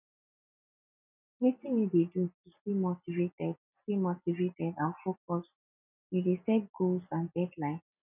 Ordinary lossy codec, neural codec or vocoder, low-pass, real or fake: none; none; 3.6 kHz; real